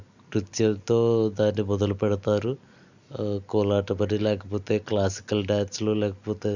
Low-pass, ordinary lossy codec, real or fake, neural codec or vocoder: 7.2 kHz; none; real; none